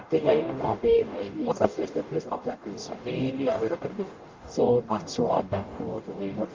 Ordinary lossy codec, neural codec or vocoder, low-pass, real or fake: Opus, 32 kbps; codec, 44.1 kHz, 0.9 kbps, DAC; 7.2 kHz; fake